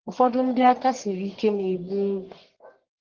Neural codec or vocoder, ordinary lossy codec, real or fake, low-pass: codec, 44.1 kHz, 1.7 kbps, Pupu-Codec; Opus, 16 kbps; fake; 7.2 kHz